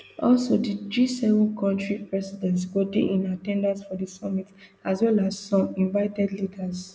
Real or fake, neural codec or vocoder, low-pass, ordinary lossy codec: real; none; none; none